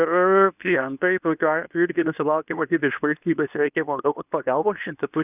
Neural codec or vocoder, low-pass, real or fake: codec, 24 kHz, 0.9 kbps, WavTokenizer, medium speech release version 2; 3.6 kHz; fake